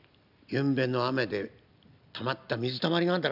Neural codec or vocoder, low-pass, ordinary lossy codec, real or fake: none; 5.4 kHz; none; real